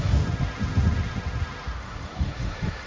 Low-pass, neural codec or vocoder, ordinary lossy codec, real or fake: none; codec, 16 kHz, 1.1 kbps, Voila-Tokenizer; none; fake